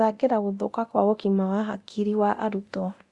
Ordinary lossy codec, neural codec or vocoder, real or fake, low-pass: Opus, 64 kbps; codec, 24 kHz, 0.9 kbps, DualCodec; fake; 10.8 kHz